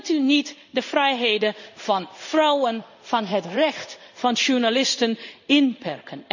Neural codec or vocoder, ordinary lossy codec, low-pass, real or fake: codec, 16 kHz in and 24 kHz out, 1 kbps, XY-Tokenizer; none; 7.2 kHz; fake